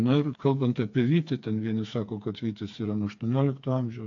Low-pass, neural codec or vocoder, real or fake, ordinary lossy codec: 7.2 kHz; codec, 16 kHz, 4 kbps, FreqCodec, smaller model; fake; AAC, 48 kbps